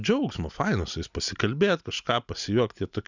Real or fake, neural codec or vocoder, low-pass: real; none; 7.2 kHz